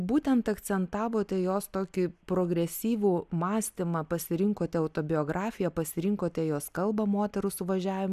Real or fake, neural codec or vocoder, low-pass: real; none; 14.4 kHz